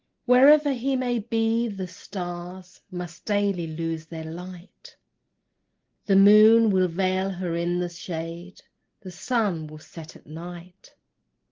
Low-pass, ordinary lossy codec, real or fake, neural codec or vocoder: 7.2 kHz; Opus, 16 kbps; real; none